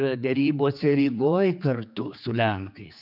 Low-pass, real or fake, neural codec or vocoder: 5.4 kHz; fake; codec, 16 kHz, 4 kbps, X-Codec, HuBERT features, trained on general audio